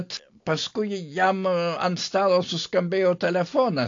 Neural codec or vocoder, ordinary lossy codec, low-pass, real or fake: none; AAC, 48 kbps; 7.2 kHz; real